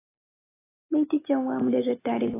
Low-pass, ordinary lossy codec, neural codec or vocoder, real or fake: 3.6 kHz; AAC, 16 kbps; none; real